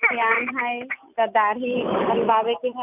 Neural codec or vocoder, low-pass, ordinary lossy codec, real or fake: none; 3.6 kHz; none; real